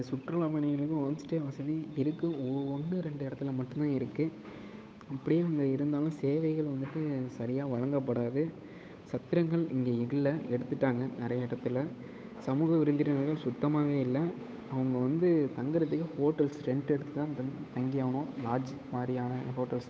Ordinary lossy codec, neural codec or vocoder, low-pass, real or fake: none; codec, 16 kHz, 8 kbps, FunCodec, trained on Chinese and English, 25 frames a second; none; fake